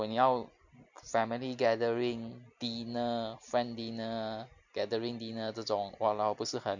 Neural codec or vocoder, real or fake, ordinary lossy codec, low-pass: none; real; none; 7.2 kHz